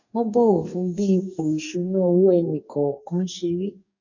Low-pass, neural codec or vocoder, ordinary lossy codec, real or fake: 7.2 kHz; codec, 44.1 kHz, 2.6 kbps, DAC; none; fake